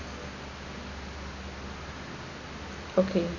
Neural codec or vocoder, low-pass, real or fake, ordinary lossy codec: none; 7.2 kHz; real; none